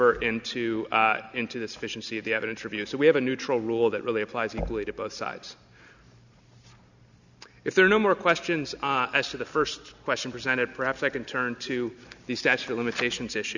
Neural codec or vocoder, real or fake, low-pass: none; real; 7.2 kHz